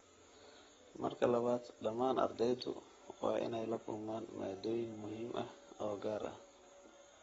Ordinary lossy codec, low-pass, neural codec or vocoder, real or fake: AAC, 24 kbps; 19.8 kHz; codec, 44.1 kHz, 7.8 kbps, Pupu-Codec; fake